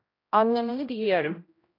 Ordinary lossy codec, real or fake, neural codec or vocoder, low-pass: AAC, 32 kbps; fake; codec, 16 kHz, 0.5 kbps, X-Codec, HuBERT features, trained on general audio; 5.4 kHz